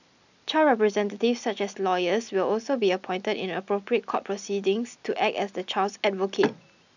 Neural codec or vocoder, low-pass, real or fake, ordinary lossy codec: none; 7.2 kHz; real; none